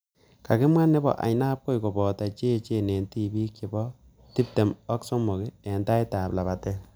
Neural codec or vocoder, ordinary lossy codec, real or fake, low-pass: none; none; real; none